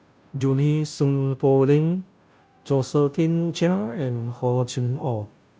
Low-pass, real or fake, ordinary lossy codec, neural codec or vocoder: none; fake; none; codec, 16 kHz, 0.5 kbps, FunCodec, trained on Chinese and English, 25 frames a second